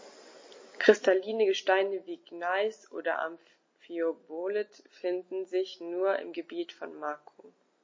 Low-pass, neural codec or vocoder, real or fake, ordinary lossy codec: 7.2 kHz; none; real; MP3, 32 kbps